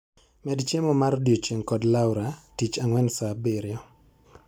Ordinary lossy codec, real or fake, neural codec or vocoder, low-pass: none; real; none; none